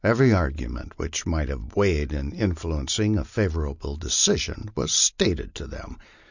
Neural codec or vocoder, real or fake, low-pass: none; real; 7.2 kHz